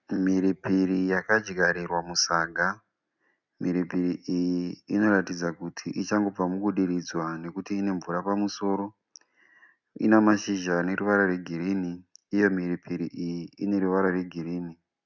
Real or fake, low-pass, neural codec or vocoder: real; 7.2 kHz; none